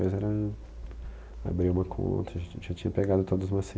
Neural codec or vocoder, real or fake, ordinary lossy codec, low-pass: none; real; none; none